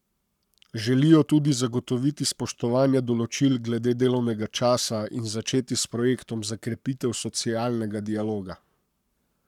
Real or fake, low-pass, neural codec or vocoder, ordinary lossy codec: fake; 19.8 kHz; codec, 44.1 kHz, 7.8 kbps, Pupu-Codec; none